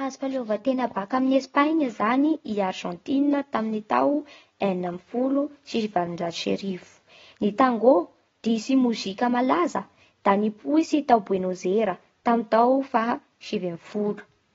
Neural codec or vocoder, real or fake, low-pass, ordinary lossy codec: none; real; 7.2 kHz; AAC, 24 kbps